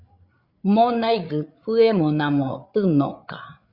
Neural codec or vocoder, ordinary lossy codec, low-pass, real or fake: codec, 16 kHz, 8 kbps, FreqCodec, larger model; Opus, 64 kbps; 5.4 kHz; fake